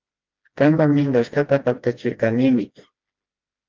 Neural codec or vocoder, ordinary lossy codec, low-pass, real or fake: codec, 16 kHz, 1 kbps, FreqCodec, smaller model; Opus, 32 kbps; 7.2 kHz; fake